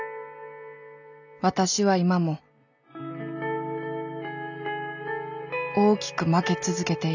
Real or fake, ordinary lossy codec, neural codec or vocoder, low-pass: real; none; none; 7.2 kHz